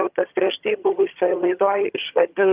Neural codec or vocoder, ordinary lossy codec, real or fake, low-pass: vocoder, 22.05 kHz, 80 mel bands, HiFi-GAN; Opus, 32 kbps; fake; 3.6 kHz